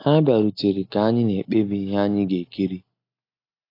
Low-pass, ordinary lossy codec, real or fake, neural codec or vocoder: 5.4 kHz; AAC, 32 kbps; real; none